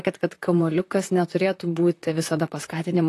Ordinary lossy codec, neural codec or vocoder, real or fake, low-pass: AAC, 48 kbps; vocoder, 44.1 kHz, 128 mel bands, Pupu-Vocoder; fake; 14.4 kHz